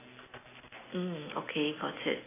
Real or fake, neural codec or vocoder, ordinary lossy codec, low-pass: real; none; AAC, 16 kbps; 3.6 kHz